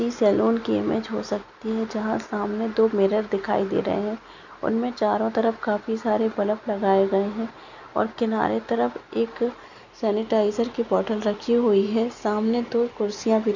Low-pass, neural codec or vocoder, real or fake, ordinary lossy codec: 7.2 kHz; none; real; none